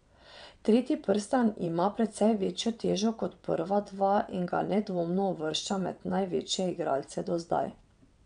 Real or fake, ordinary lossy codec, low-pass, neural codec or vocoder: fake; none; 9.9 kHz; vocoder, 22.05 kHz, 80 mel bands, WaveNeXt